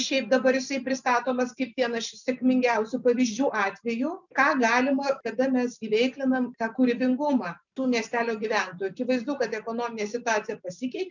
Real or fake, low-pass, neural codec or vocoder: real; 7.2 kHz; none